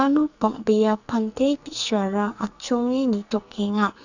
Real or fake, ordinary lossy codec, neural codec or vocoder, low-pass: fake; none; codec, 44.1 kHz, 2.6 kbps, SNAC; 7.2 kHz